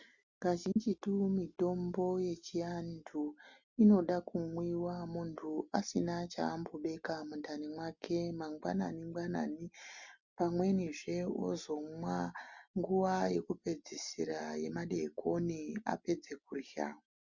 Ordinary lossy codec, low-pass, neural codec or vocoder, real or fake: Opus, 64 kbps; 7.2 kHz; none; real